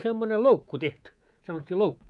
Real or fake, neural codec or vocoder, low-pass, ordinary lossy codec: fake; codec, 24 kHz, 3.1 kbps, DualCodec; 10.8 kHz; none